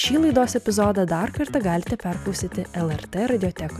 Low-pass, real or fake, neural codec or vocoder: 14.4 kHz; real; none